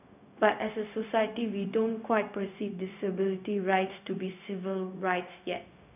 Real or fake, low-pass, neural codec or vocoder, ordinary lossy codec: fake; 3.6 kHz; codec, 16 kHz, 0.4 kbps, LongCat-Audio-Codec; none